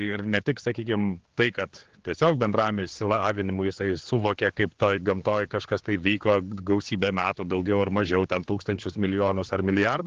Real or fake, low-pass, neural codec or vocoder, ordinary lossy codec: fake; 7.2 kHz; codec, 16 kHz, 4 kbps, X-Codec, HuBERT features, trained on general audio; Opus, 16 kbps